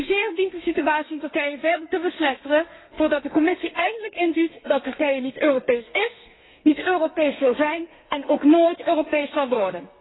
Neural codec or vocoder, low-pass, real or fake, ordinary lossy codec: codec, 32 kHz, 1.9 kbps, SNAC; 7.2 kHz; fake; AAC, 16 kbps